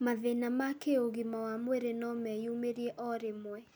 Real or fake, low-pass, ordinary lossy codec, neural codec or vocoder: real; none; none; none